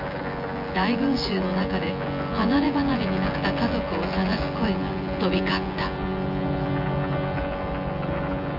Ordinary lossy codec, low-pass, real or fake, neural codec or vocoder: none; 5.4 kHz; fake; vocoder, 24 kHz, 100 mel bands, Vocos